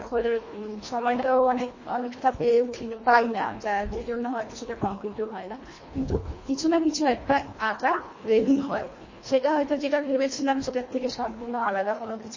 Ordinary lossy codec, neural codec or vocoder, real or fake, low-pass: MP3, 32 kbps; codec, 24 kHz, 1.5 kbps, HILCodec; fake; 7.2 kHz